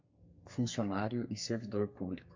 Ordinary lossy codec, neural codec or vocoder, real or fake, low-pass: MP3, 64 kbps; codec, 32 kHz, 1.9 kbps, SNAC; fake; 7.2 kHz